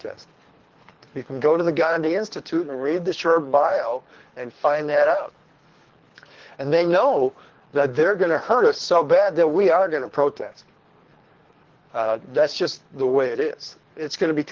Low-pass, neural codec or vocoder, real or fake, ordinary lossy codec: 7.2 kHz; codec, 24 kHz, 3 kbps, HILCodec; fake; Opus, 16 kbps